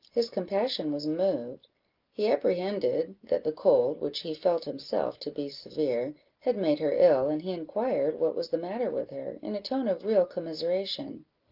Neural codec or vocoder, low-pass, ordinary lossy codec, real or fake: none; 5.4 kHz; Opus, 32 kbps; real